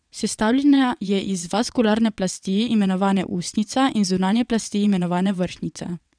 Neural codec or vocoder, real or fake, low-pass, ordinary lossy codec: vocoder, 22.05 kHz, 80 mel bands, WaveNeXt; fake; 9.9 kHz; none